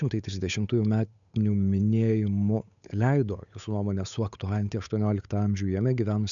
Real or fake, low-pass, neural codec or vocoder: fake; 7.2 kHz; codec, 16 kHz, 8 kbps, FunCodec, trained on Chinese and English, 25 frames a second